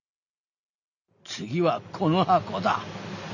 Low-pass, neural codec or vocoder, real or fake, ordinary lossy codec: 7.2 kHz; none; real; none